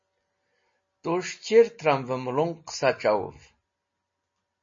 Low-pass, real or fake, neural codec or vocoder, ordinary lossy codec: 7.2 kHz; real; none; MP3, 32 kbps